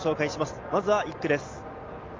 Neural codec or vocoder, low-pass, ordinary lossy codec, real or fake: none; 7.2 kHz; Opus, 32 kbps; real